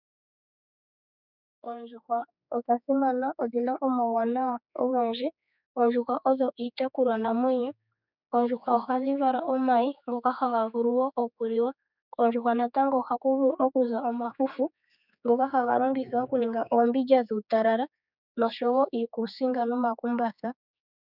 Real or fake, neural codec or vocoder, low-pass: fake; codec, 16 kHz, 4 kbps, X-Codec, HuBERT features, trained on general audio; 5.4 kHz